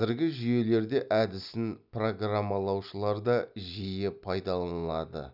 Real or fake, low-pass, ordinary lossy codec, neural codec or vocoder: real; 5.4 kHz; none; none